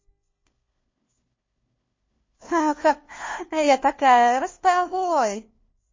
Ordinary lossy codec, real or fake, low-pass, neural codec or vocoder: MP3, 32 kbps; fake; 7.2 kHz; codec, 16 kHz, 1 kbps, FunCodec, trained on LibriTTS, 50 frames a second